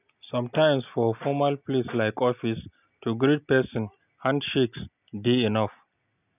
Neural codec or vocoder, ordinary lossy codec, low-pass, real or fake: none; none; 3.6 kHz; real